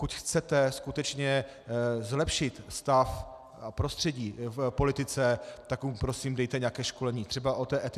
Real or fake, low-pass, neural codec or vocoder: real; 14.4 kHz; none